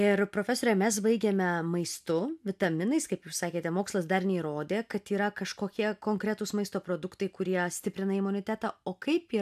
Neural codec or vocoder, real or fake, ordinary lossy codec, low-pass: none; real; AAC, 96 kbps; 14.4 kHz